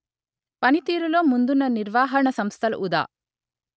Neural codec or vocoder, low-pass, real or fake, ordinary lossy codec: none; none; real; none